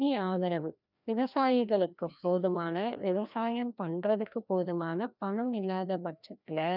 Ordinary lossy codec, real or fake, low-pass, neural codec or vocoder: none; fake; 5.4 kHz; codec, 16 kHz, 1 kbps, FreqCodec, larger model